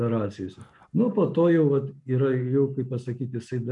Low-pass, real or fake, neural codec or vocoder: 10.8 kHz; real; none